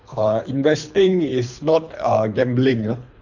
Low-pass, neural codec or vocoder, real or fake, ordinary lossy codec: 7.2 kHz; codec, 24 kHz, 3 kbps, HILCodec; fake; none